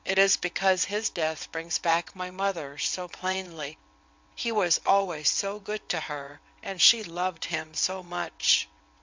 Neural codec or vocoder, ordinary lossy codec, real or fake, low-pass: vocoder, 22.05 kHz, 80 mel bands, WaveNeXt; MP3, 64 kbps; fake; 7.2 kHz